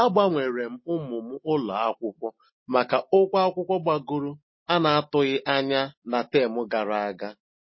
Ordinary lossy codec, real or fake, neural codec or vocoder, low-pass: MP3, 24 kbps; real; none; 7.2 kHz